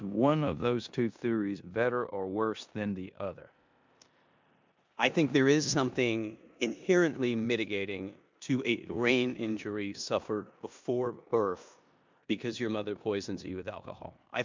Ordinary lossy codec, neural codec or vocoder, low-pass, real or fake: MP3, 64 kbps; codec, 16 kHz in and 24 kHz out, 0.9 kbps, LongCat-Audio-Codec, four codebook decoder; 7.2 kHz; fake